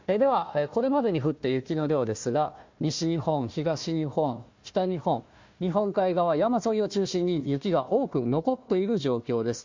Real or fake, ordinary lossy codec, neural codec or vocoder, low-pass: fake; MP3, 48 kbps; codec, 16 kHz, 1 kbps, FunCodec, trained on Chinese and English, 50 frames a second; 7.2 kHz